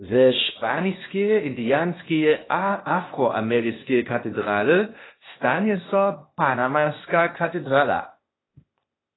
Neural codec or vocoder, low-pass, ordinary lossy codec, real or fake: codec, 16 kHz, 0.8 kbps, ZipCodec; 7.2 kHz; AAC, 16 kbps; fake